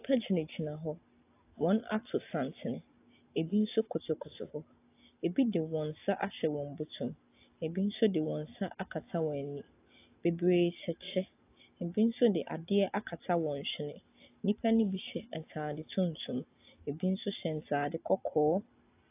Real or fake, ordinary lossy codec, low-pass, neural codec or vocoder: real; AAC, 24 kbps; 3.6 kHz; none